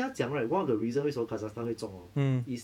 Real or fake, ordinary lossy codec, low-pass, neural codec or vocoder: fake; none; 19.8 kHz; vocoder, 48 kHz, 128 mel bands, Vocos